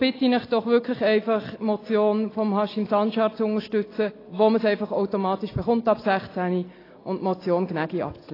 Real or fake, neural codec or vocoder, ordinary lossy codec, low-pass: real; none; AAC, 24 kbps; 5.4 kHz